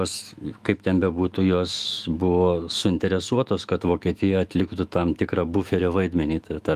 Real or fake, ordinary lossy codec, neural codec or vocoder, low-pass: fake; Opus, 32 kbps; autoencoder, 48 kHz, 128 numbers a frame, DAC-VAE, trained on Japanese speech; 14.4 kHz